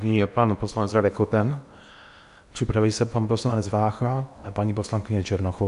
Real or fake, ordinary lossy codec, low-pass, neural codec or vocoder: fake; AAC, 96 kbps; 10.8 kHz; codec, 16 kHz in and 24 kHz out, 0.8 kbps, FocalCodec, streaming, 65536 codes